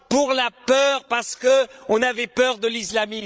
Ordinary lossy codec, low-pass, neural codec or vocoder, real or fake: none; none; codec, 16 kHz, 16 kbps, FreqCodec, larger model; fake